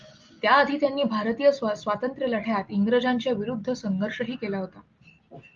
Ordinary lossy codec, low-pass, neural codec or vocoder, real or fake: Opus, 32 kbps; 7.2 kHz; none; real